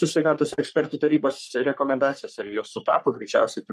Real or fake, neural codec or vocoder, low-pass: fake; codec, 44.1 kHz, 3.4 kbps, Pupu-Codec; 14.4 kHz